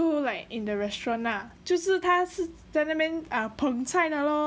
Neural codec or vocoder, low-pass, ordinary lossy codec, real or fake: none; none; none; real